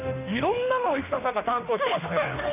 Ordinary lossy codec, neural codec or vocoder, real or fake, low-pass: none; autoencoder, 48 kHz, 32 numbers a frame, DAC-VAE, trained on Japanese speech; fake; 3.6 kHz